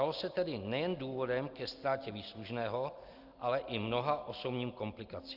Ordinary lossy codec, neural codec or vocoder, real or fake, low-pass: Opus, 24 kbps; none; real; 5.4 kHz